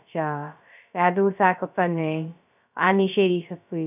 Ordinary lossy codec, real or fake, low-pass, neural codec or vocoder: none; fake; 3.6 kHz; codec, 16 kHz, 0.2 kbps, FocalCodec